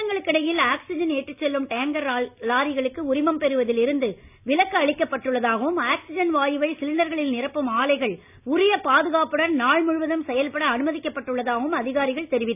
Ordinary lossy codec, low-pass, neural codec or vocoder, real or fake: none; 3.6 kHz; none; real